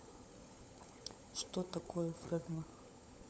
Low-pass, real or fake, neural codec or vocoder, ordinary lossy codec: none; fake; codec, 16 kHz, 16 kbps, FunCodec, trained on LibriTTS, 50 frames a second; none